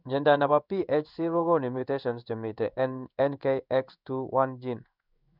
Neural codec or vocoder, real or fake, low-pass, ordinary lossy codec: codec, 16 kHz in and 24 kHz out, 1 kbps, XY-Tokenizer; fake; 5.4 kHz; none